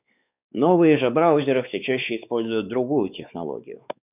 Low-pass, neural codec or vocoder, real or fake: 3.6 kHz; codec, 16 kHz, 4 kbps, X-Codec, WavLM features, trained on Multilingual LibriSpeech; fake